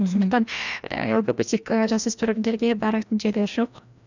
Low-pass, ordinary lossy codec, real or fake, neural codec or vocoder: 7.2 kHz; none; fake; codec, 16 kHz, 1 kbps, FreqCodec, larger model